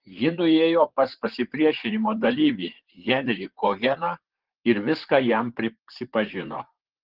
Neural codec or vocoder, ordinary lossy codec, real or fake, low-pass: vocoder, 44.1 kHz, 128 mel bands, Pupu-Vocoder; Opus, 16 kbps; fake; 5.4 kHz